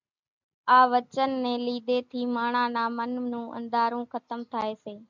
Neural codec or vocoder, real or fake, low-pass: none; real; 7.2 kHz